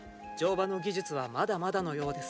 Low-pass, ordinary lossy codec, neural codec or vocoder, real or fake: none; none; none; real